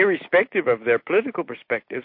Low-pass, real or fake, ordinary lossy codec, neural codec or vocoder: 5.4 kHz; real; MP3, 32 kbps; none